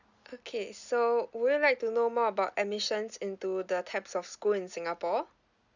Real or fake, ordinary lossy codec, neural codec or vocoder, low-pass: real; none; none; 7.2 kHz